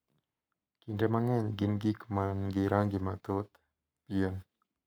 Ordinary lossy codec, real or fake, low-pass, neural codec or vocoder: none; fake; none; codec, 44.1 kHz, 7.8 kbps, Pupu-Codec